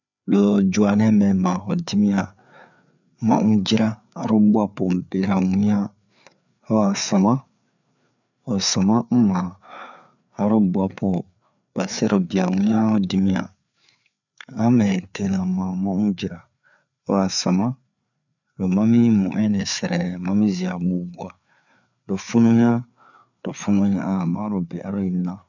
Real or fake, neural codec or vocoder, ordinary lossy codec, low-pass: fake; codec, 16 kHz, 4 kbps, FreqCodec, larger model; none; 7.2 kHz